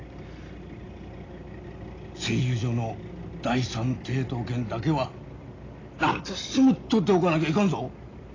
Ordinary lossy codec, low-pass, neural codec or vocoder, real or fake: AAC, 32 kbps; 7.2 kHz; none; real